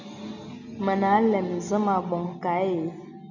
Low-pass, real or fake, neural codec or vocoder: 7.2 kHz; real; none